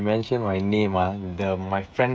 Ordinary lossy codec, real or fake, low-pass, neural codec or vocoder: none; fake; none; codec, 16 kHz, 16 kbps, FreqCodec, smaller model